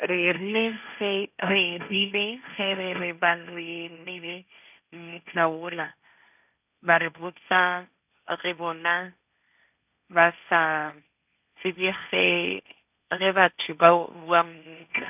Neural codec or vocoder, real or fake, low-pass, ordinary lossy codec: codec, 16 kHz, 1.1 kbps, Voila-Tokenizer; fake; 3.6 kHz; none